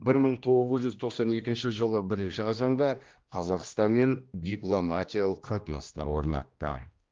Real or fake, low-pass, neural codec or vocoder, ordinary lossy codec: fake; 7.2 kHz; codec, 16 kHz, 1 kbps, X-Codec, HuBERT features, trained on general audio; Opus, 32 kbps